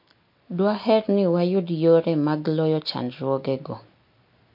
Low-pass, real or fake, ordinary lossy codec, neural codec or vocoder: 5.4 kHz; real; MP3, 32 kbps; none